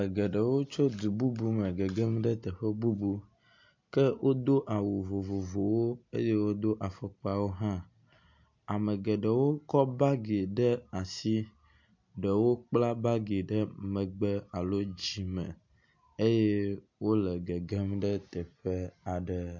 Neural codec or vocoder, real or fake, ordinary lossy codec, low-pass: none; real; MP3, 48 kbps; 7.2 kHz